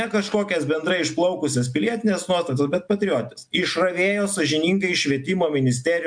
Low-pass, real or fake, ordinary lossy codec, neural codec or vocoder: 9.9 kHz; real; MP3, 64 kbps; none